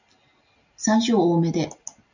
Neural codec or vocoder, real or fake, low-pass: none; real; 7.2 kHz